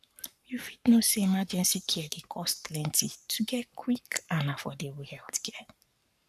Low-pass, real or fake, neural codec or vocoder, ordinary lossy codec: 14.4 kHz; fake; codec, 44.1 kHz, 7.8 kbps, Pupu-Codec; none